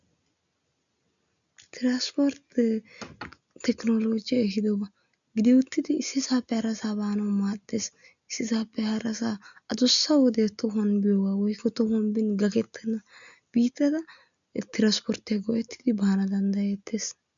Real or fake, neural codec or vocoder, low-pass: real; none; 7.2 kHz